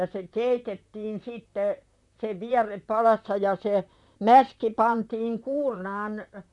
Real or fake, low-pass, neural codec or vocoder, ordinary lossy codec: fake; 10.8 kHz; vocoder, 44.1 kHz, 128 mel bands, Pupu-Vocoder; none